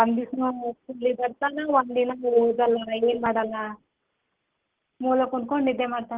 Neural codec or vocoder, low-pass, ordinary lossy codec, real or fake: none; 3.6 kHz; Opus, 16 kbps; real